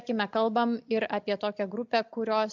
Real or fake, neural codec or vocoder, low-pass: real; none; 7.2 kHz